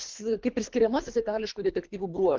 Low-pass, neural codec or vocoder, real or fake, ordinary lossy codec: 7.2 kHz; codec, 24 kHz, 3 kbps, HILCodec; fake; Opus, 32 kbps